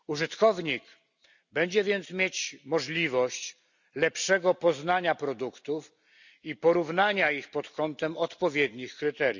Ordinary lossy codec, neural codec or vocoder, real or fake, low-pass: none; none; real; 7.2 kHz